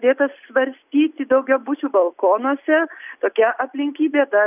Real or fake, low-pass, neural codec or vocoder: real; 3.6 kHz; none